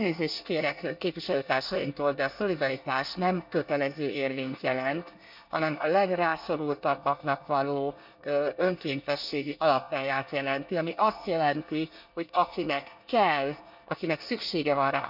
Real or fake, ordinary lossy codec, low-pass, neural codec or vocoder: fake; none; 5.4 kHz; codec, 24 kHz, 1 kbps, SNAC